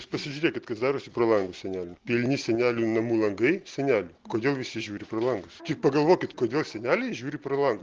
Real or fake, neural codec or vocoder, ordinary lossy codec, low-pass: real; none; Opus, 16 kbps; 7.2 kHz